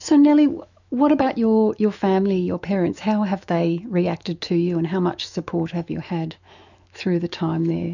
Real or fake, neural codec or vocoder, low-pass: fake; autoencoder, 48 kHz, 128 numbers a frame, DAC-VAE, trained on Japanese speech; 7.2 kHz